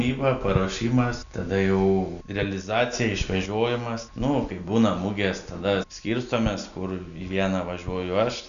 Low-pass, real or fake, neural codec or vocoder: 7.2 kHz; real; none